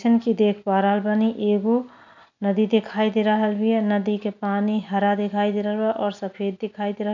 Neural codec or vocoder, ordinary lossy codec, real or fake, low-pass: none; none; real; 7.2 kHz